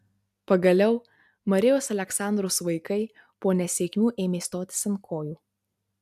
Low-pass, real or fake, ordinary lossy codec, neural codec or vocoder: 14.4 kHz; real; AAC, 96 kbps; none